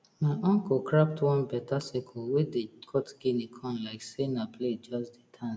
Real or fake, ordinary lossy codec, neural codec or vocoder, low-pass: real; none; none; none